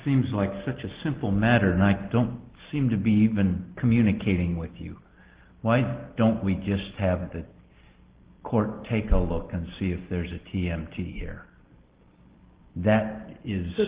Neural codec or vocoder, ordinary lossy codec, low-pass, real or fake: none; Opus, 16 kbps; 3.6 kHz; real